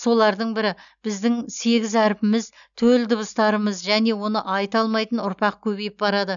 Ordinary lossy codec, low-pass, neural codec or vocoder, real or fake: none; 7.2 kHz; none; real